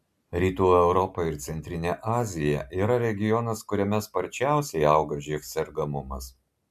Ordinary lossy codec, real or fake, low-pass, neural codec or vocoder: MP3, 96 kbps; real; 14.4 kHz; none